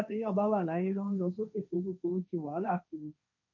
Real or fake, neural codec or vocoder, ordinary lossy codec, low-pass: fake; codec, 16 kHz, 1.1 kbps, Voila-Tokenizer; none; 7.2 kHz